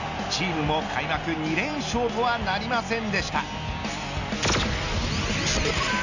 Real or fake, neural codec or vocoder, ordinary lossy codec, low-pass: real; none; none; 7.2 kHz